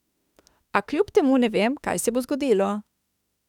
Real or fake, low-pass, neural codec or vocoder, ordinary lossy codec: fake; 19.8 kHz; autoencoder, 48 kHz, 32 numbers a frame, DAC-VAE, trained on Japanese speech; none